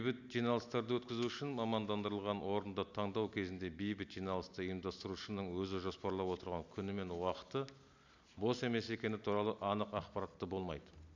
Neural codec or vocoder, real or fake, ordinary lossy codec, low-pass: none; real; none; 7.2 kHz